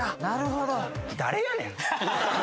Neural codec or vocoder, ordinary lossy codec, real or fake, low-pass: none; none; real; none